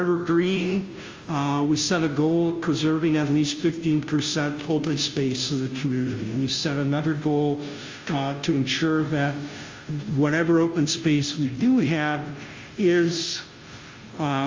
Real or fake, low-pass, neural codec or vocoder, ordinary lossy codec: fake; 7.2 kHz; codec, 16 kHz, 0.5 kbps, FunCodec, trained on Chinese and English, 25 frames a second; Opus, 32 kbps